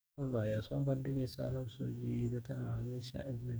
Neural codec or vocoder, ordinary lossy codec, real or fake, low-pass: codec, 44.1 kHz, 2.6 kbps, DAC; none; fake; none